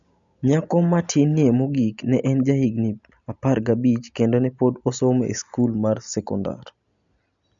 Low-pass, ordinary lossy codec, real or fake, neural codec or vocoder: 7.2 kHz; none; real; none